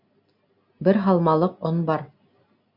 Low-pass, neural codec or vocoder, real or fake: 5.4 kHz; none; real